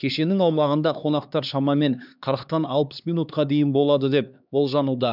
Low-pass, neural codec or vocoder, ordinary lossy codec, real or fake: 5.4 kHz; codec, 16 kHz, 2 kbps, X-Codec, HuBERT features, trained on LibriSpeech; none; fake